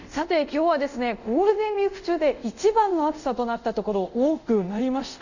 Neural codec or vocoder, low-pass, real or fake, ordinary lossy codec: codec, 24 kHz, 0.5 kbps, DualCodec; 7.2 kHz; fake; none